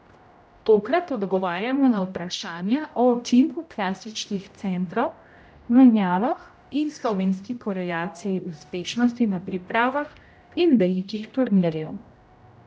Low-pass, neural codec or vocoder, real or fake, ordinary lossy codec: none; codec, 16 kHz, 0.5 kbps, X-Codec, HuBERT features, trained on general audio; fake; none